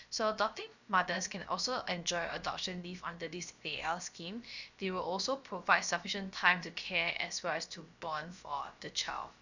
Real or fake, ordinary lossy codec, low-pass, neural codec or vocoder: fake; none; 7.2 kHz; codec, 16 kHz, about 1 kbps, DyCAST, with the encoder's durations